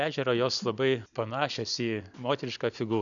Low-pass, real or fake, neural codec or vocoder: 7.2 kHz; real; none